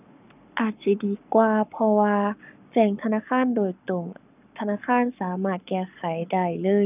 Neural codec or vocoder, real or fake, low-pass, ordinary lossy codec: codec, 44.1 kHz, 7.8 kbps, Pupu-Codec; fake; 3.6 kHz; none